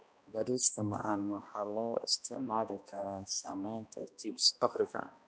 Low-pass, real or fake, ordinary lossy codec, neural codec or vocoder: none; fake; none; codec, 16 kHz, 1 kbps, X-Codec, HuBERT features, trained on balanced general audio